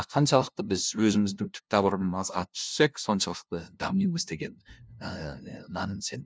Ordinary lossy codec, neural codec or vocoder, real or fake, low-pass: none; codec, 16 kHz, 0.5 kbps, FunCodec, trained on LibriTTS, 25 frames a second; fake; none